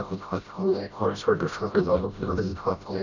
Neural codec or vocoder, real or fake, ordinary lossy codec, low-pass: codec, 16 kHz, 0.5 kbps, FreqCodec, smaller model; fake; none; 7.2 kHz